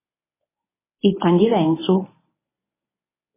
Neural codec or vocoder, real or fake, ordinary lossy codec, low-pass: codec, 24 kHz, 0.9 kbps, WavTokenizer, medium speech release version 1; fake; MP3, 16 kbps; 3.6 kHz